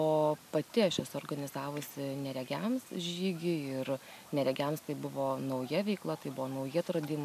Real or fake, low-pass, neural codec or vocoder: real; 14.4 kHz; none